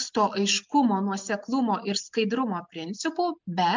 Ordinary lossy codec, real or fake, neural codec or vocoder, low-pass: MP3, 64 kbps; real; none; 7.2 kHz